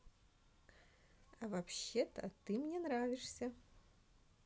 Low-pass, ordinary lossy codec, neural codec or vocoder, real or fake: none; none; none; real